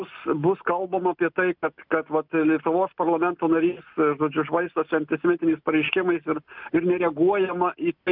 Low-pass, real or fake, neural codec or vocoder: 5.4 kHz; real; none